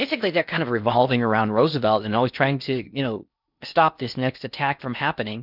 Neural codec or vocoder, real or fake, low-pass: codec, 16 kHz in and 24 kHz out, 0.6 kbps, FocalCodec, streaming, 4096 codes; fake; 5.4 kHz